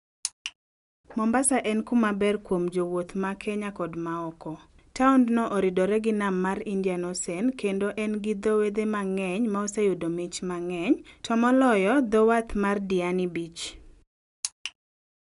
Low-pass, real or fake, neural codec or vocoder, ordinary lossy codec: 10.8 kHz; real; none; none